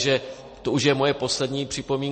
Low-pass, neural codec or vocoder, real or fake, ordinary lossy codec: 10.8 kHz; none; real; MP3, 32 kbps